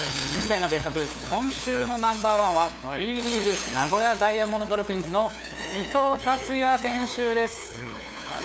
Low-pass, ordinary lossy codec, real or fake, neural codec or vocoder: none; none; fake; codec, 16 kHz, 2 kbps, FunCodec, trained on LibriTTS, 25 frames a second